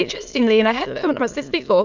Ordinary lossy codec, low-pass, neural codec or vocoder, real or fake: MP3, 64 kbps; 7.2 kHz; autoencoder, 22.05 kHz, a latent of 192 numbers a frame, VITS, trained on many speakers; fake